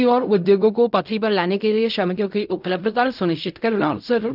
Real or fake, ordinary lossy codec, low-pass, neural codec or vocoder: fake; none; 5.4 kHz; codec, 16 kHz in and 24 kHz out, 0.4 kbps, LongCat-Audio-Codec, fine tuned four codebook decoder